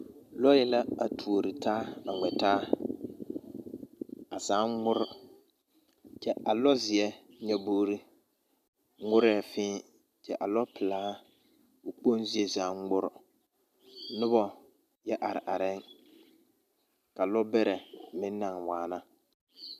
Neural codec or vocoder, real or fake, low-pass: none; real; 14.4 kHz